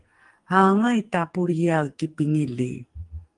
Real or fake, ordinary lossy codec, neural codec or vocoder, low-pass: fake; Opus, 24 kbps; codec, 44.1 kHz, 2.6 kbps, SNAC; 10.8 kHz